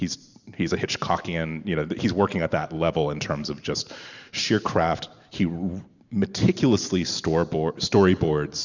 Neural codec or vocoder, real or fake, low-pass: vocoder, 44.1 kHz, 128 mel bands every 512 samples, BigVGAN v2; fake; 7.2 kHz